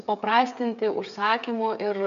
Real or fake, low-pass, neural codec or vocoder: fake; 7.2 kHz; codec, 16 kHz, 8 kbps, FreqCodec, smaller model